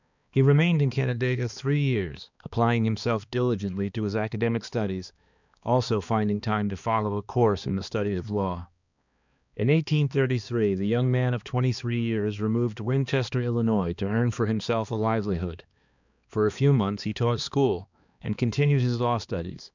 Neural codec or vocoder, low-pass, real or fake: codec, 16 kHz, 2 kbps, X-Codec, HuBERT features, trained on balanced general audio; 7.2 kHz; fake